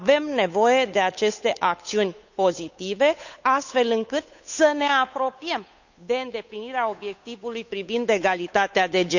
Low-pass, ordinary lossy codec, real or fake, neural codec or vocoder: 7.2 kHz; none; fake; codec, 16 kHz, 8 kbps, FunCodec, trained on Chinese and English, 25 frames a second